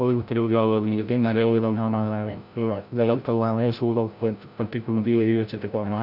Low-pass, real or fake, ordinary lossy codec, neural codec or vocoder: 5.4 kHz; fake; none; codec, 16 kHz, 0.5 kbps, FreqCodec, larger model